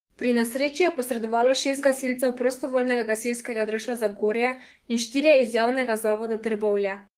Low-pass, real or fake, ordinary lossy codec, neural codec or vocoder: 14.4 kHz; fake; Opus, 24 kbps; codec, 32 kHz, 1.9 kbps, SNAC